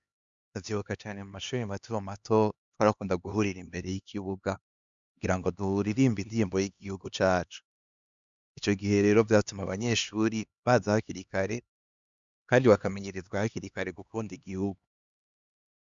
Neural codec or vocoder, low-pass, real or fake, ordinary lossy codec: codec, 16 kHz, 2 kbps, X-Codec, HuBERT features, trained on LibriSpeech; 7.2 kHz; fake; Opus, 64 kbps